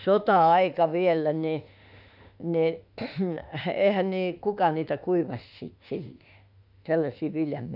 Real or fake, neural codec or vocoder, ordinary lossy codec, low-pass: fake; autoencoder, 48 kHz, 32 numbers a frame, DAC-VAE, trained on Japanese speech; none; 5.4 kHz